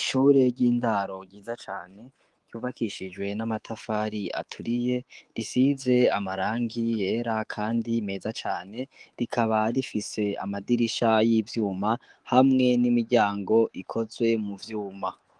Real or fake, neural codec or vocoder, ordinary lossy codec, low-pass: fake; codec, 24 kHz, 3.1 kbps, DualCodec; Opus, 24 kbps; 10.8 kHz